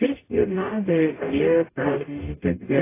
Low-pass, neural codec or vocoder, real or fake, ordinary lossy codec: 3.6 kHz; codec, 44.1 kHz, 0.9 kbps, DAC; fake; AAC, 16 kbps